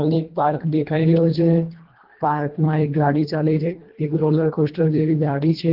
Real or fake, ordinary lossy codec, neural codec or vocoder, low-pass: fake; Opus, 16 kbps; codec, 24 kHz, 1.5 kbps, HILCodec; 5.4 kHz